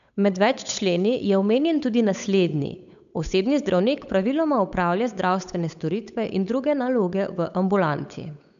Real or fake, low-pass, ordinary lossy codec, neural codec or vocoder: fake; 7.2 kHz; none; codec, 16 kHz, 8 kbps, FunCodec, trained on Chinese and English, 25 frames a second